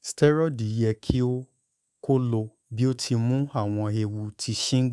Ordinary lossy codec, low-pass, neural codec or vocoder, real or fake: none; none; codec, 24 kHz, 3.1 kbps, DualCodec; fake